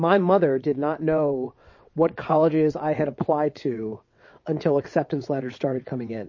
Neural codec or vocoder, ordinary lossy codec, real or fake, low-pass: vocoder, 22.05 kHz, 80 mel bands, WaveNeXt; MP3, 32 kbps; fake; 7.2 kHz